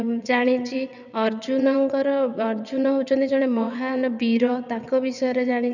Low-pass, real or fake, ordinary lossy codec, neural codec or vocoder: 7.2 kHz; fake; none; vocoder, 22.05 kHz, 80 mel bands, WaveNeXt